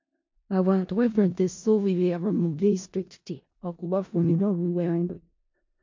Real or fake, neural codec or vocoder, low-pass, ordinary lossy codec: fake; codec, 16 kHz in and 24 kHz out, 0.4 kbps, LongCat-Audio-Codec, four codebook decoder; 7.2 kHz; MP3, 48 kbps